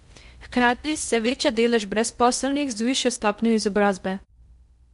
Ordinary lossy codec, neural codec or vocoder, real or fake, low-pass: MP3, 64 kbps; codec, 16 kHz in and 24 kHz out, 0.6 kbps, FocalCodec, streaming, 4096 codes; fake; 10.8 kHz